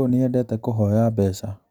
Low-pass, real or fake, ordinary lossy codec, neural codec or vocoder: none; real; none; none